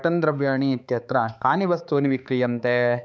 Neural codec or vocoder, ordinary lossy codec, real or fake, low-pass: codec, 16 kHz, 4 kbps, X-Codec, HuBERT features, trained on balanced general audio; none; fake; none